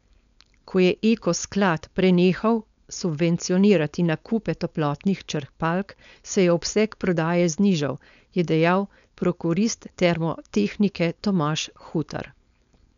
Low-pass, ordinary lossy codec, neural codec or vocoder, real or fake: 7.2 kHz; none; codec, 16 kHz, 4.8 kbps, FACodec; fake